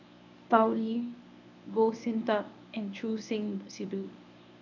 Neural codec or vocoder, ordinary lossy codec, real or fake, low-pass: codec, 24 kHz, 0.9 kbps, WavTokenizer, medium speech release version 1; none; fake; 7.2 kHz